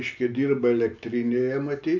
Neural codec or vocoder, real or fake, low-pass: none; real; 7.2 kHz